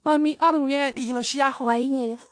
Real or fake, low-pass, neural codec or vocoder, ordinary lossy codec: fake; 9.9 kHz; codec, 16 kHz in and 24 kHz out, 0.4 kbps, LongCat-Audio-Codec, four codebook decoder; AAC, 64 kbps